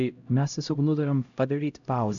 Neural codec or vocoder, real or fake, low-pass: codec, 16 kHz, 0.5 kbps, X-Codec, HuBERT features, trained on LibriSpeech; fake; 7.2 kHz